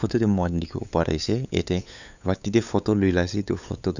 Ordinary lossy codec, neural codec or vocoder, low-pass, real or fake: none; codec, 16 kHz, 8 kbps, FunCodec, trained on LibriTTS, 25 frames a second; 7.2 kHz; fake